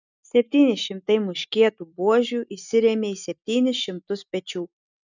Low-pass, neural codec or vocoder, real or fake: 7.2 kHz; none; real